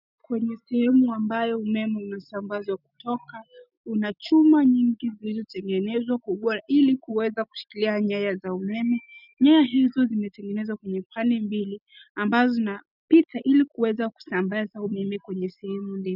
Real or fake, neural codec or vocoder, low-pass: real; none; 5.4 kHz